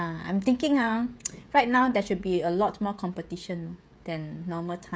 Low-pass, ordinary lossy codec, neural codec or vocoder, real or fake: none; none; codec, 16 kHz, 16 kbps, FreqCodec, smaller model; fake